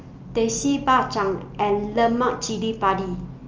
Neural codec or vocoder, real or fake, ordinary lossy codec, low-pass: none; real; Opus, 24 kbps; 7.2 kHz